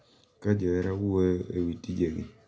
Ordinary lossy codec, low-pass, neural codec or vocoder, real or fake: none; none; none; real